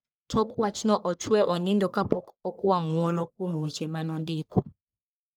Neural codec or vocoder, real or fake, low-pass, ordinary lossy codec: codec, 44.1 kHz, 1.7 kbps, Pupu-Codec; fake; none; none